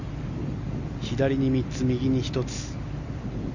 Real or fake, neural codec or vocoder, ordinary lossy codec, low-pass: real; none; none; 7.2 kHz